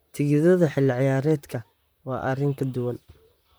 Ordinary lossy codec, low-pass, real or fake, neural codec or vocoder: none; none; fake; vocoder, 44.1 kHz, 128 mel bands, Pupu-Vocoder